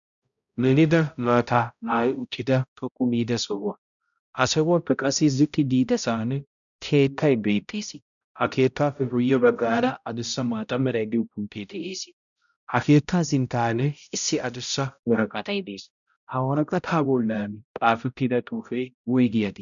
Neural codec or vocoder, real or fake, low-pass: codec, 16 kHz, 0.5 kbps, X-Codec, HuBERT features, trained on balanced general audio; fake; 7.2 kHz